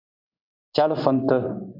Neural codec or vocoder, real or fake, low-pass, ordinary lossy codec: codec, 16 kHz in and 24 kHz out, 1 kbps, XY-Tokenizer; fake; 5.4 kHz; MP3, 48 kbps